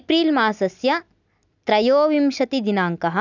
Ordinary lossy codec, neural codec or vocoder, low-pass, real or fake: none; none; 7.2 kHz; real